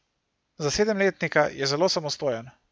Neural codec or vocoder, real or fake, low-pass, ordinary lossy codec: none; real; none; none